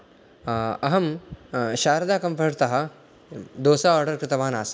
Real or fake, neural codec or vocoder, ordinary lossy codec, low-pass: real; none; none; none